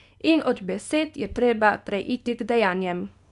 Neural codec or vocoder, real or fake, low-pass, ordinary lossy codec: codec, 24 kHz, 0.9 kbps, WavTokenizer, small release; fake; 10.8 kHz; none